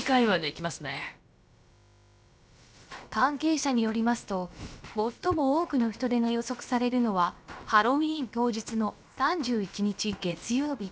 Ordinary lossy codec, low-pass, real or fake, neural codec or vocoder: none; none; fake; codec, 16 kHz, about 1 kbps, DyCAST, with the encoder's durations